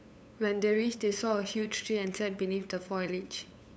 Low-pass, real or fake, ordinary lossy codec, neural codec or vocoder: none; fake; none; codec, 16 kHz, 8 kbps, FunCodec, trained on LibriTTS, 25 frames a second